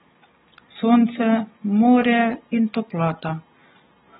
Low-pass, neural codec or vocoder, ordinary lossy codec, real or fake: 7.2 kHz; none; AAC, 16 kbps; real